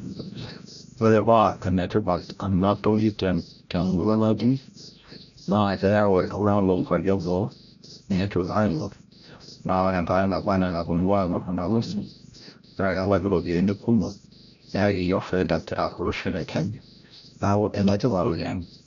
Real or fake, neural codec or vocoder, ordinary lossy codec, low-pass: fake; codec, 16 kHz, 0.5 kbps, FreqCodec, larger model; none; 7.2 kHz